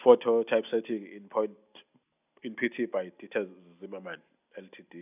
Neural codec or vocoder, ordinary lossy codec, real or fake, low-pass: none; none; real; 3.6 kHz